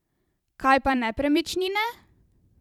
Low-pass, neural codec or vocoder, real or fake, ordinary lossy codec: 19.8 kHz; vocoder, 44.1 kHz, 128 mel bands every 512 samples, BigVGAN v2; fake; none